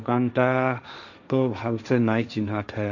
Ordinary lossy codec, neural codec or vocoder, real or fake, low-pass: none; codec, 16 kHz, 1.1 kbps, Voila-Tokenizer; fake; 7.2 kHz